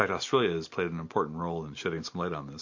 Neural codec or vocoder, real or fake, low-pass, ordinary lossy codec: none; real; 7.2 kHz; MP3, 48 kbps